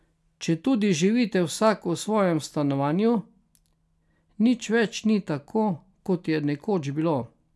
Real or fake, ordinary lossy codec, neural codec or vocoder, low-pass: real; none; none; none